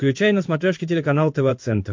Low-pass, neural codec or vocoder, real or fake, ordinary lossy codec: 7.2 kHz; codec, 16 kHz in and 24 kHz out, 1 kbps, XY-Tokenizer; fake; MP3, 48 kbps